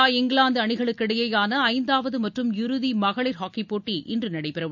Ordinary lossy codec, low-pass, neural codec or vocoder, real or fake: none; 7.2 kHz; none; real